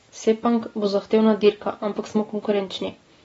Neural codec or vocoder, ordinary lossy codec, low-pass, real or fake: none; AAC, 24 kbps; 19.8 kHz; real